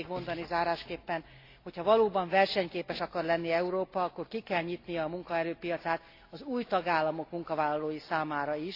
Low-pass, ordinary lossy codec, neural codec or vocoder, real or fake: 5.4 kHz; AAC, 32 kbps; none; real